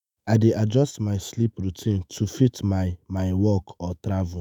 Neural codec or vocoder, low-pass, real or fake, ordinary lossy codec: autoencoder, 48 kHz, 128 numbers a frame, DAC-VAE, trained on Japanese speech; none; fake; none